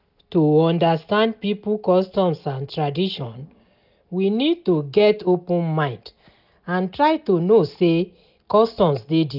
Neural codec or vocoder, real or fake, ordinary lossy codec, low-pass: none; real; none; 5.4 kHz